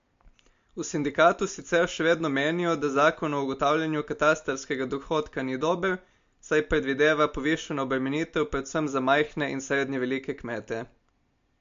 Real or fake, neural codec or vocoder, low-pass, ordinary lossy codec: real; none; 7.2 kHz; MP3, 48 kbps